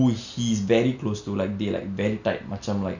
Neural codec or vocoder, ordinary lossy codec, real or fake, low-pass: none; none; real; 7.2 kHz